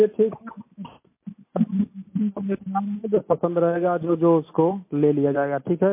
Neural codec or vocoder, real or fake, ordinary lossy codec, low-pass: none; real; MP3, 24 kbps; 3.6 kHz